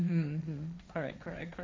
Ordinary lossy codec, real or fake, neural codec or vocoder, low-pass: none; fake; codec, 16 kHz, 1.1 kbps, Voila-Tokenizer; none